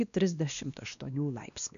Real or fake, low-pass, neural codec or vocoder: fake; 7.2 kHz; codec, 16 kHz, 1 kbps, X-Codec, WavLM features, trained on Multilingual LibriSpeech